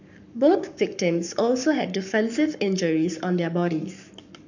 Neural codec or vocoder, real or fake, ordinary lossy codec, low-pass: codec, 44.1 kHz, 7.8 kbps, Pupu-Codec; fake; none; 7.2 kHz